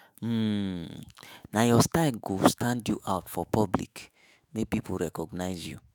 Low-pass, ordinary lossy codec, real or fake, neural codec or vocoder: none; none; fake; autoencoder, 48 kHz, 128 numbers a frame, DAC-VAE, trained on Japanese speech